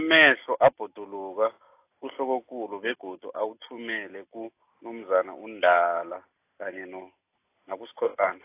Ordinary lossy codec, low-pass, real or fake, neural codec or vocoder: AAC, 24 kbps; 3.6 kHz; real; none